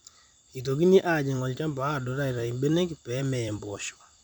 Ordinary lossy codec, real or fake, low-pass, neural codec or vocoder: none; fake; 19.8 kHz; vocoder, 44.1 kHz, 128 mel bands every 256 samples, BigVGAN v2